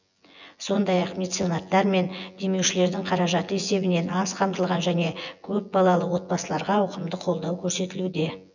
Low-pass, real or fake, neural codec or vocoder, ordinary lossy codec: 7.2 kHz; fake; vocoder, 24 kHz, 100 mel bands, Vocos; none